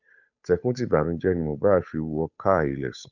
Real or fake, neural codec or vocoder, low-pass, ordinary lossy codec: fake; codec, 16 kHz, 8 kbps, FunCodec, trained on Chinese and English, 25 frames a second; 7.2 kHz; none